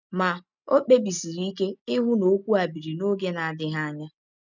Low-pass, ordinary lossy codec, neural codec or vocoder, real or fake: 7.2 kHz; none; none; real